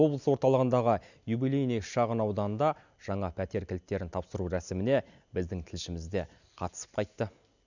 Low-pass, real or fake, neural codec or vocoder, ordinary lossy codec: 7.2 kHz; real; none; none